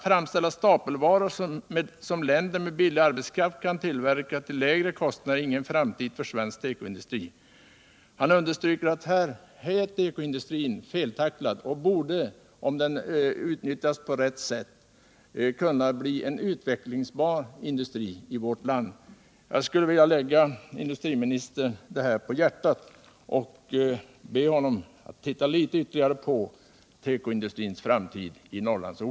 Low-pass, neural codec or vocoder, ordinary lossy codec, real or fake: none; none; none; real